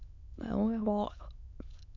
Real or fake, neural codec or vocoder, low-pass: fake; autoencoder, 22.05 kHz, a latent of 192 numbers a frame, VITS, trained on many speakers; 7.2 kHz